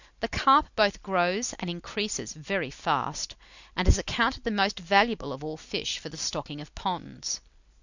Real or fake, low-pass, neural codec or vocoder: real; 7.2 kHz; none